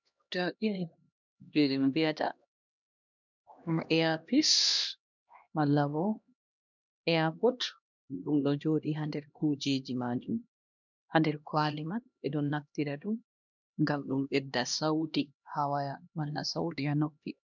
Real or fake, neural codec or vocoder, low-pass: fake; codec, 16 kHz, 1 kbps, X-Codec, HuBERT features, trained on LibriSpeech; 7.2 kHz